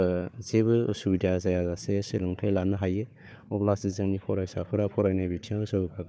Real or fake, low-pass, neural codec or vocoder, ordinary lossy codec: fake; none; codec, 16 kHz, 4 kbps, FunCodec, trained on Chinese and English, 50 frames a second; none